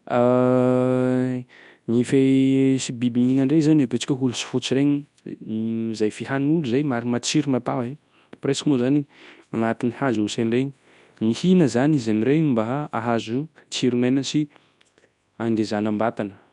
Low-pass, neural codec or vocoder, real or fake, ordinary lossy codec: 10.8 kHz; codec, 24 kHz, 0.9 kbps, WavTokenizer, large speech release; fake; MP3, 96 kbps